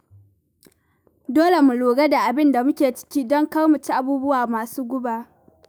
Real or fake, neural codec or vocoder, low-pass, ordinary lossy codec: fake; autoencoder, 48 kHz, 128 numbers a frame, DAC-VAE, trained on Japanese speech; none; none